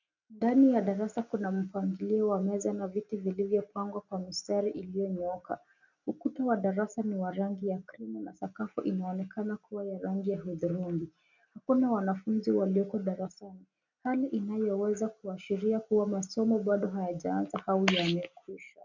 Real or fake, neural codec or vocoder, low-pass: real; none; 7.2 kHz